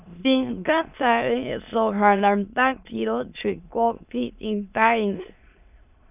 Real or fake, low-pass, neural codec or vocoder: fake; 3.6 kHz; autoencoder, 22.05 kHz, a latent of 192 numbers a frame, VITS, trained on many speakers